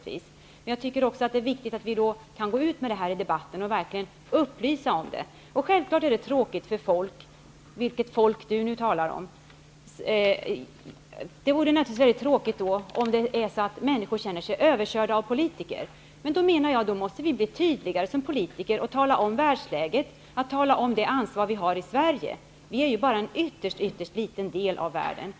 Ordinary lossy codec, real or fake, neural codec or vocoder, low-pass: none; real; none; none